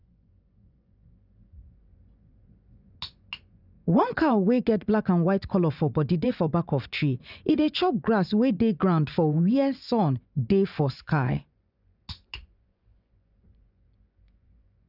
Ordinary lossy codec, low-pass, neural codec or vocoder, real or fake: none; 5.4 kHz; vocoder, 24 kHz, 100 mel bands, Vocos; fake